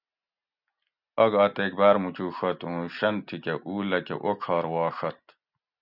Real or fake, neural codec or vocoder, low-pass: real; none; 5.4 kHz